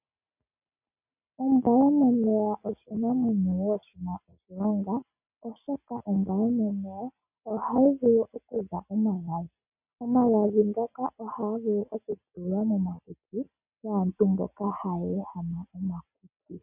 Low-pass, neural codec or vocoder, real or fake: 3.6 kHz; none; real